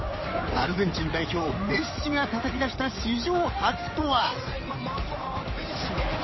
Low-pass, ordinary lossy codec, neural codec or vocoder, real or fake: 7.2 kHz; MP3, 24 kbps; codec, 16 kHz in and 24 kHz out, 2.2 kbps, FireRedTTS-2 codec; fake